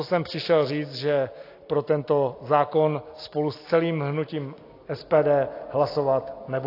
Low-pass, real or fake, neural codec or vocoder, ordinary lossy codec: 5.4 kHz; real; none; AAC, 32 kbps